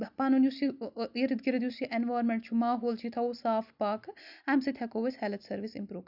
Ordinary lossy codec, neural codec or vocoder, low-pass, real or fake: none; none; 5.4 kHz; real